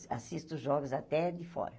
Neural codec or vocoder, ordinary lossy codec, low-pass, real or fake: none; none; none; real